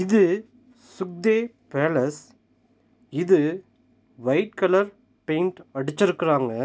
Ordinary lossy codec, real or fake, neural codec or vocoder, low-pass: none; real; none; none